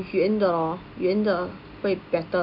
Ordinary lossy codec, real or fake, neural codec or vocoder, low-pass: none; real; none; 5.4 kHz